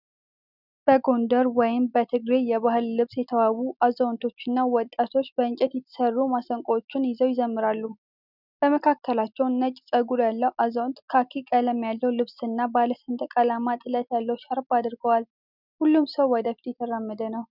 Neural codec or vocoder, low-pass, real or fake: none; 5.4 kHz; real